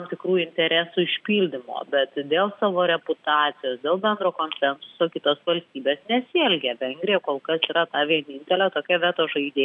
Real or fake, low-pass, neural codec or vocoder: real; 10.8 kHz; none